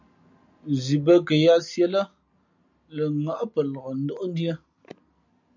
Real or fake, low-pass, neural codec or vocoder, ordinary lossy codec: real; 7.2 kHz; none; MP3, 64 kbps